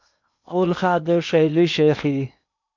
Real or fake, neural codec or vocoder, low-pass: fake; codec, 16 kHz in and 24 kHz out, 0.8 kbps, FocalCodec, streaming, 65536 codes; 7.2 kHz